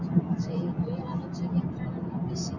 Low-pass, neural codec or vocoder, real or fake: 7.2 kHz; none; real